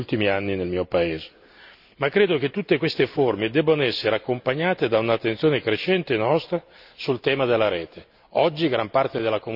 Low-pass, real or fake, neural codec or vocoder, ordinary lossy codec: 5.4 kHz; real; none; none